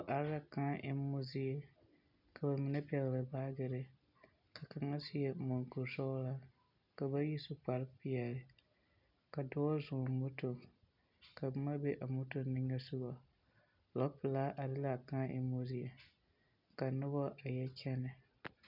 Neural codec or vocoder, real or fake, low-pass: none; real; 5.4 kHz